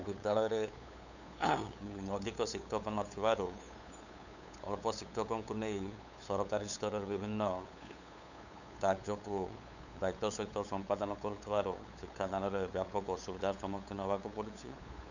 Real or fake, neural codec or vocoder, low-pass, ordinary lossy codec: fake; codec, 16 kHz, 8 kbps, FunCodec, trained on LibriTTS, 25 frames a second; 7.2 kHz; none